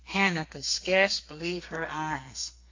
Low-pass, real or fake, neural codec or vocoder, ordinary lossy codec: 7.2 kHz; fake; codec, 32 kHz, 1.9 kbps, SNAC; AAC, 48 kbps